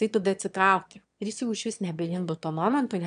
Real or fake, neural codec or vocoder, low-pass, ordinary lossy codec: fake; autoencoder, 22.05 kHz, a latent of 192 numbers a frame, VITS, trained on one speaker; 9.9 kHz; AAC, 96 kbps